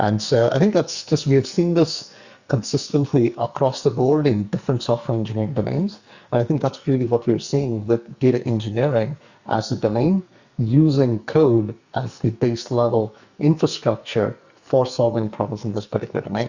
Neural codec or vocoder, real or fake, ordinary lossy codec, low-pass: codec, 44.1 kHz, 2.6 kbps, SNAC; fake; Opus, 64 kbps; 7.2 kHz